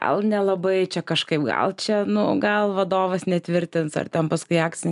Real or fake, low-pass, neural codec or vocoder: real; 10.8 kHz; none